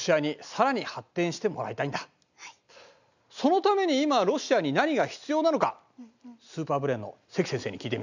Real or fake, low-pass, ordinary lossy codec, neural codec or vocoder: real; 7.2 kHz; none; none